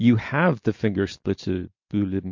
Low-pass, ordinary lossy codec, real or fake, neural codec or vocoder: 7.2 kHz; MP3, 48 kbps; real; none